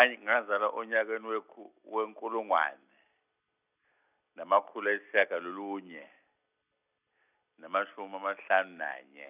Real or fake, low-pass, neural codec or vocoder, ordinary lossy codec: real; 3.6 kHz; none; none